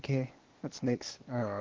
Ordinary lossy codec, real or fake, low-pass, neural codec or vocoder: Opus, 16 kbps; fake; 7.2 kHz; codec, 16 kHz, 0.8 kbps, ZipCodec